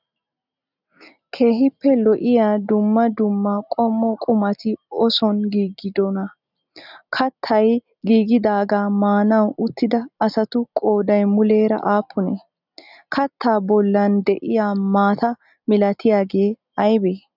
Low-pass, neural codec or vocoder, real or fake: 5.4 kHz; none; real